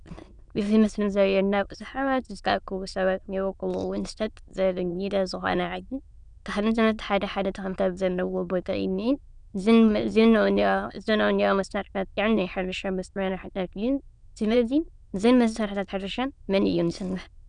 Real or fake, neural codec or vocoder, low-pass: fake; autoencoder, 22.05 kHz, a latent of 192 numbers a frame, VITS, trained on many speakers; 9.9 kHz